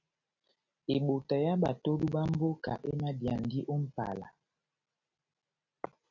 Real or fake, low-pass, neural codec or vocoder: real; 7.2 kHz; none